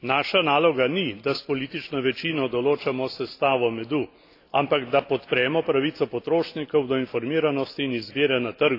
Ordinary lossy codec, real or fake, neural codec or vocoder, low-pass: AAC, 32 kbps; real; none; 5.4 kHz